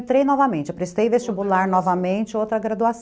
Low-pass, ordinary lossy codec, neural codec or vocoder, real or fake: none; none; none; real